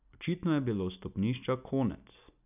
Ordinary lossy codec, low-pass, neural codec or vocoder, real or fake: none; 3.6 kHz; none; real